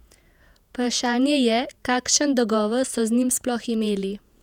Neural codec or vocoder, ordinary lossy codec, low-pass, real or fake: vocoder, 48 kHz, 128 mel bands, Vocos; none; 19.8 kHz; fake